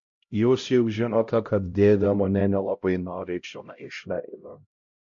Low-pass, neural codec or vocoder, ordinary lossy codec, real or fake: 7.2 kHz; codec, 16 kHz, 0.5 kbps, X-Codec, HuBERT features, trained on LibriSpeech; MP3, 48 kbps; fake